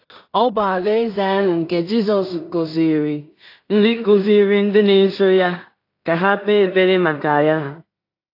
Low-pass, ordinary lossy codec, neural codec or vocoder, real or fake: 5.4 kHz; AAC, 32 kbps; codec, 16 kHz in and 24 kHz out, 0.4 kbps, LongCat-Audio-Codec, two codebook decoder; fake